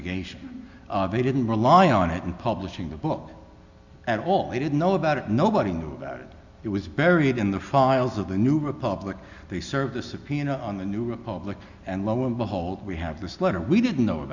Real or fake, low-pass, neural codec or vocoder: real; 7.2 kHz; none